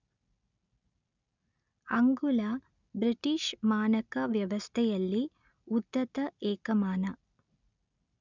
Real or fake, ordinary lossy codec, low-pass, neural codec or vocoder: real; none; 7.2 kHz; none